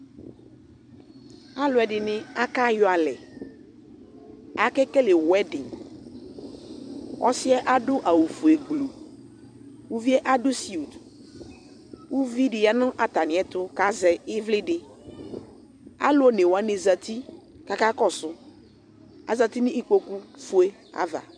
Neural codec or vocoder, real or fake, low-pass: vocoder, 44.1 kHz, 128 mel bands every 256 samples, BigVGAN v2; fake; 9.9 kHz